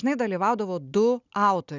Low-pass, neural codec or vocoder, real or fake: 7.2 kHz; none; real